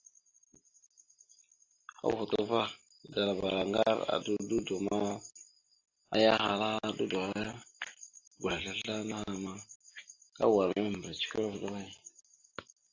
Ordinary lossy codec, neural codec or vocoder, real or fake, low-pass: AAC, 48 kbps; none; real; 7.2 kHz